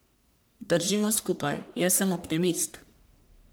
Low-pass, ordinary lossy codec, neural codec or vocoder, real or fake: none; none; codec, 44.1 kHz, 1.7 kbps, Pupu-Codec; fake